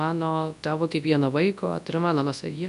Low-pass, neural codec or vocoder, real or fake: 10.8 kHz; codec, 24 kHz, 0.9 kbps, WavTokenizer, large speech release; fake